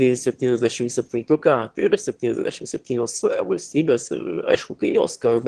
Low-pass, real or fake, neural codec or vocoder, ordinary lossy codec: 9.9 kHz; fake; autoencoder, 22.05 kHz, a latent of 192 numbers a frame, VITS, trained on one speaker; Opus, 16 kbps